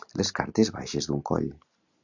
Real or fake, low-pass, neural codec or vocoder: real; 7.2 kHz; none